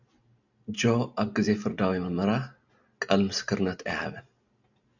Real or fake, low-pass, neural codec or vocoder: real; 7.2 kHz; none